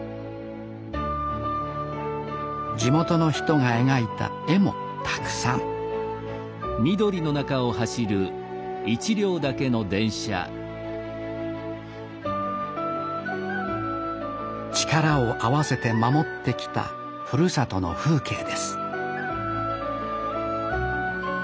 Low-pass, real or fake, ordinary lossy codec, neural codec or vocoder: none; real; none; none